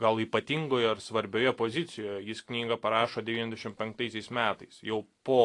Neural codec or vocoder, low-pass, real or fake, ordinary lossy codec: none; 10.8 kHz; real; AAC, 48 kbps